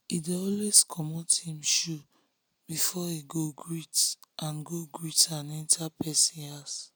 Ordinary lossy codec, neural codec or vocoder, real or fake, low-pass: none; none; real; none